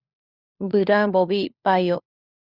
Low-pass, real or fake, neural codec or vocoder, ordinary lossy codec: 5.4 kHz; fake; codec, 16 kHz, 4 kbps, FunCodec, trained on LibriTTS, 50 frames a second; Opus, 64 kbps